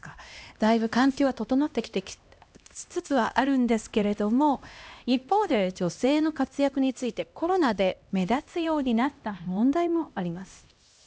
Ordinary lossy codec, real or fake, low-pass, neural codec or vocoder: none; fake; none; codec, 16 kHz, 1 kbps, X-Codec, HuBERT features, trained on LibriSpeech